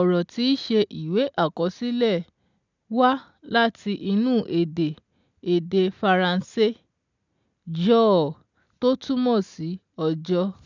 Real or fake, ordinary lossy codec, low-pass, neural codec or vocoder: real; none; 7.2 kHz; none